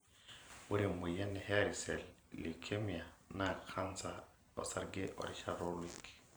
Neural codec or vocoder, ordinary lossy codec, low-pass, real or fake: vocoder, 44.1 kHz, 128 mel bands every 512 samples, BigVGAN v2; none; none; fake